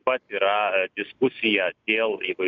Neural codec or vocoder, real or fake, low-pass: vocoder, 44.1 kHz, 128 mel bands every 256 samples, BigVGAN v2; fake; 7.2 kHz